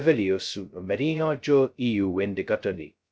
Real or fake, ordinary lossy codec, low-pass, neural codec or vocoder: fake; none; none; codec, 16 kHz, 0.2 kbps, FocalCodec